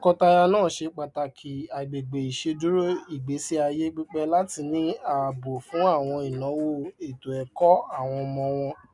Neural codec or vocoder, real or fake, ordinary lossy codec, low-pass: none; real; none; 10.8 kHz